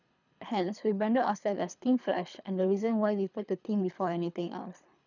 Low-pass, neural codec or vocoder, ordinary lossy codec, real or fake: 7.2 kHz; codec, 24 kHz, 3 kbps, HILCodec; none; fake